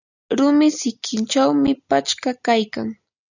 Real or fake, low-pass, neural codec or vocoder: real; 7.2 kHz; none